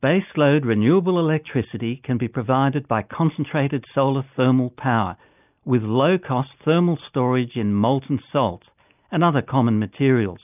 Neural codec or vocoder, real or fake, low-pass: none; real; 3.6 kHz